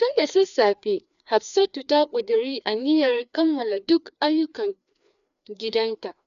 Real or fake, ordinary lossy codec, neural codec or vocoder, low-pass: fake; none; codec, 16 kHz, 2 kbps, FreqCodec, larger model; 7.2 kHz